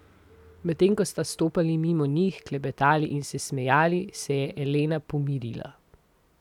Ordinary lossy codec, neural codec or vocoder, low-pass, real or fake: none; none; 19.8 kHz; real